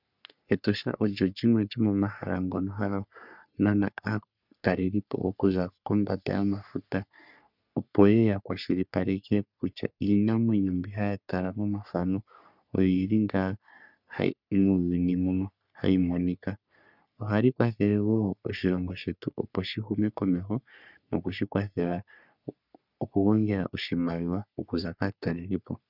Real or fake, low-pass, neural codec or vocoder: fake; 5.4 kHz; codec, 44.1 kHz, 3.4 kbps, Pupu-Codec